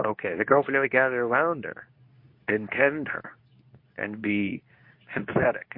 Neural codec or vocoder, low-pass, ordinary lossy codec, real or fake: codec, 24 kHz, 0.9 kbps, WavTokenizer, medium speech release version 2; 5.4 kHz; MP3, 32 kbps; fake